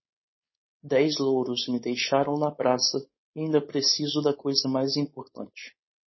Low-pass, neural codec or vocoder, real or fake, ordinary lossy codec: 7.2 kHz; codec, 16 kHz, 4.8 kbps, FACodec; fake; MP3, 24 kbps